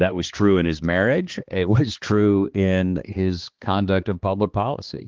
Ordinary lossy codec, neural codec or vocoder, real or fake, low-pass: Opus, 16 kbps; codec, 16 kHz, 2 kbps, X-Codec, HuBERT features, trained on balanced general audio; fake; 7.2 kHz